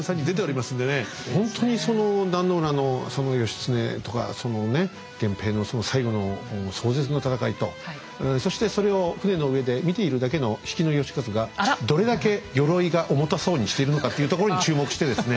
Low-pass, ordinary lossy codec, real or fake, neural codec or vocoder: none; none; real; none